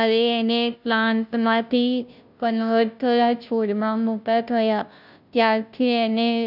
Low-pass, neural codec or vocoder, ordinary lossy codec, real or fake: 5.4 kHz; codec, 16 kHz, 0.5 kbps, FunCodec, trained on Chinese and English, 25 frames a second; none; fake